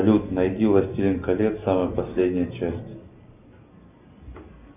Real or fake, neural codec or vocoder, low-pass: real; none; 3.6 kHz